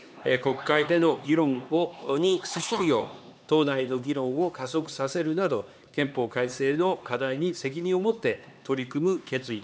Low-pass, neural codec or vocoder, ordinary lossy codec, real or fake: none; codec, 16 kHz, 2 kbps, X-Codec, HuBERT features, trained on LibriSpeech; none; fake